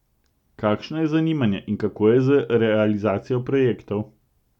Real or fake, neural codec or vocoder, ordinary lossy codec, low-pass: real; none; none; 19.8 kHz